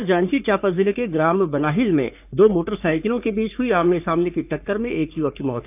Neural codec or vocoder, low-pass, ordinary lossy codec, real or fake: codec, 44.1 kHz, 3.4 kbps, Pupu-Codec; 3.6 kHz; AAC, 32 kbps; fake